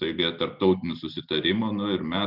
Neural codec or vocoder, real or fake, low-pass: none; real; 5.4 kHz